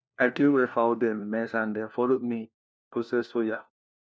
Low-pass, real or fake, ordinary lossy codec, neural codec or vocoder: none; fake; none; codec, 16 kHz, 1 kbps, FunCodec, trained on LibriTTS, 50 frames a second